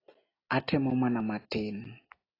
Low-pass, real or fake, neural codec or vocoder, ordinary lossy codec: 5.4 kHz; real; none; AAC, 24 kbps